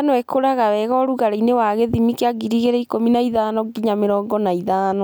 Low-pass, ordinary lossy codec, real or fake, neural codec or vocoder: none; none; real; none